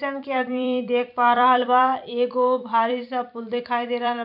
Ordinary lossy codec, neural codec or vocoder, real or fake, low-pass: MP3, 48 kbps; vocoder, 44.1 kHz, 128 mel bands every 256 samples, BigVGAN v2; fake; 5.4 kHz